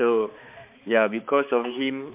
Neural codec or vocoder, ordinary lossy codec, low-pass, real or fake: codec, 16 kHz, 4 kbps, X-Codec, HuBERT features, trained on balanced general audio; MP3, 32 kbps; 3.6 kHz; fake